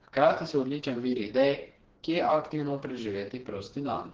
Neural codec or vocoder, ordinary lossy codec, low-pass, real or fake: codec, 16 kHz, 2 kbps, FreqCodec, smaller model; Opus, 16 kbps; 7.2 kHz; fake